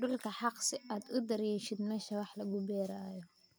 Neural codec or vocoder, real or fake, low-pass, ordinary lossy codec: none; real; none; none